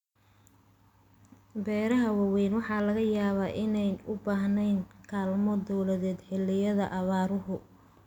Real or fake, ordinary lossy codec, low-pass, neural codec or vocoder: real; none; 19.8 kHz; none